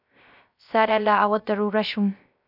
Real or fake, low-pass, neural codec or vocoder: fake; 5.4 kHz; codec, 16 kHz, 0.3 kbps, FocalCodec